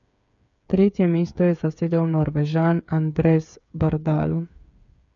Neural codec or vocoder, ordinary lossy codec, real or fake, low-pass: codec, 16 kHz, 8 kbps, FreqCodec, smaller model; none; fake; 7.2 kHz